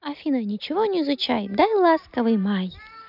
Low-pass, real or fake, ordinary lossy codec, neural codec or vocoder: 5.4 kHz; real; none; none